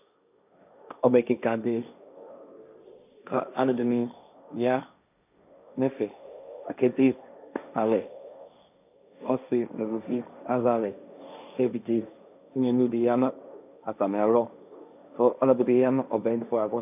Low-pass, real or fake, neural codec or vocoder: 3.6 kHz; fake; codec, 16 kHz, 1.1 kbps, Voila-Tokenizer